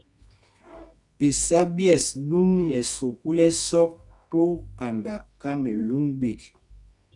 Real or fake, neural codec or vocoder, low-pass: fake; codec, 24 kHz, 0.9 kbps, WavTokenizer, medium music audio release; 10.8 kHz